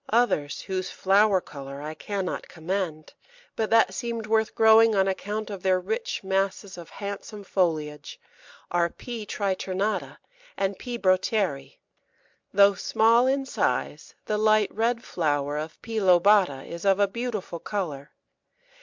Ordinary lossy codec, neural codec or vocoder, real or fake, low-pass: MP3, 64 kbps; none; real; 7.2 kHz